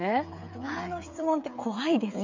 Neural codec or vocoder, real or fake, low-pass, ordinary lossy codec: codec, 16 kHz, 8 kbps, FreqCodec, smaller model; fake; 7.2 kHz; MP3, 48 kbps